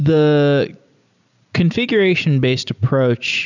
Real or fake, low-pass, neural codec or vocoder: fake; 7.2 kHz; vocoder, 44.1 kHz, 128 mel bands every 256 samples, BigVGAN v2